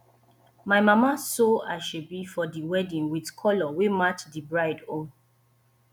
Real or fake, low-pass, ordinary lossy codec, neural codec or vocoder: real; 19.8 kHz; none; none